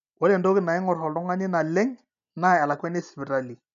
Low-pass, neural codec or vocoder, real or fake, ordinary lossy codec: 7.2 kHz; none; real; none